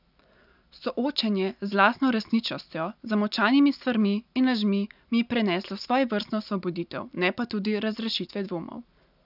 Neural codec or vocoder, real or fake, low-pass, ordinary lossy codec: none; real; 5.4 kHz; none